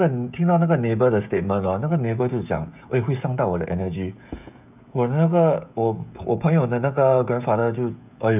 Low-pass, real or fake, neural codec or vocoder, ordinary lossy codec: 3.6 kHz; fake; codec, 16 kHz, 16 kbps, FreqCodec, smaller model; none